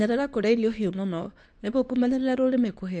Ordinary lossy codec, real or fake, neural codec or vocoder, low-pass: none; fake; codec, 24 kHz, 0.9 kbps, WavTokenizer, medium speech release version 2; 9.9 kHz